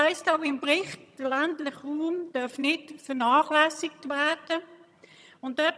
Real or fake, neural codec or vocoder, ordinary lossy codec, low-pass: fake; vocoder, 22.05 kHz, 80 mel bands, HiFi-GAN; none; none